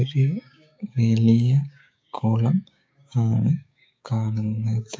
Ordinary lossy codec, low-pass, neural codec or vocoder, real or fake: none; none; codec, 16 kHz, 6 kbps, DAC; fake